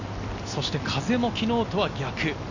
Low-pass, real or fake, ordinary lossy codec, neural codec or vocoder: 7.2 kHz; real; none; none